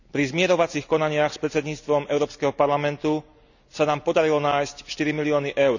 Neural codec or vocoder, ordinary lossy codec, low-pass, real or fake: none; none; 7.2 kHz; real